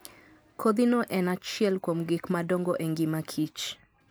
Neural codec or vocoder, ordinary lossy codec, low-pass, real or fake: none; none; none; real